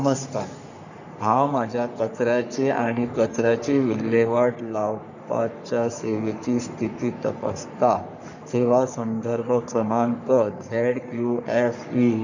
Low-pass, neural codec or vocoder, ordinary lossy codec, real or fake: 7.2 kHz; codec, 44.1 kHz, 3.4 kbps, Pupu-Codec; none; fake